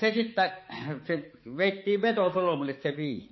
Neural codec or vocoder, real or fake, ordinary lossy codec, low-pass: codec, 16 kHz, 4 kbps, X-Codec, HuBERT features, trained on balanced general audio; fake; MP3, 24 kbps; 7.2 kHz